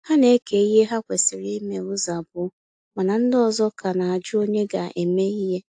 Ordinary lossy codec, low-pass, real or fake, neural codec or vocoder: AAC, 64 kbps; 9.9 kHz; real; none